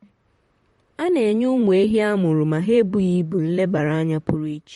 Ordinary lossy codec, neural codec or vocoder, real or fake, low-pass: MP3, 48 kbps; vocoder, 44.1 kHz, 128 mel bands, Pupu-Vocoder; fake; 19.8 kHz